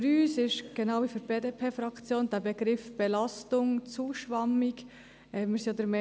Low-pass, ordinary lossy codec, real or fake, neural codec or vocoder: none; none; real; none